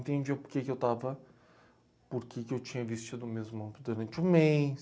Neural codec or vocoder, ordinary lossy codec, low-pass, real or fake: none; none; none; real